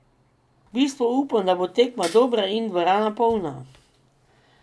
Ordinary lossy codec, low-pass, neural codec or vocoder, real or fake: none; none; none; real